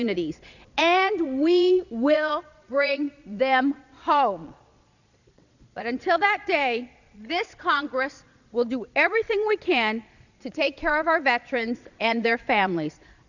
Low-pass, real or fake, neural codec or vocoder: 7.2 kHz; fake; vocoder, 22.05 kHz, 80 mel bands, Vocos